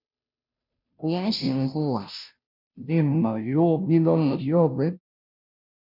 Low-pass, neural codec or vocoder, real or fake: 5.4 kHz; codec, 16 kHz, 0.5 kbps, FunCodec, trained on Chinese and English, 25 frames a second; fake